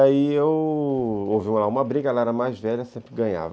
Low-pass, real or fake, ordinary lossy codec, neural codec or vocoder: none; real; none; none